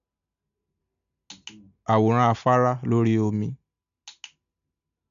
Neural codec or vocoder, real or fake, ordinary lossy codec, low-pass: none; real; MP3, 64 kbps; 7.2 kHz